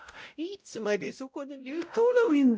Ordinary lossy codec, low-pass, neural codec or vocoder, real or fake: none; none; codec, 16 kHz, 0.5 kbps, X-Codec, WavLM features, trained on Multilingual LibriSpeech; fake